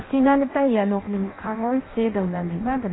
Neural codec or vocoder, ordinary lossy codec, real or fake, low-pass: codec, 16 kHz in and 24 kHz out, 0.6 kbps, FireRedTTS-2 codec; AAC, 16 kbps; fake; 7.2 kHz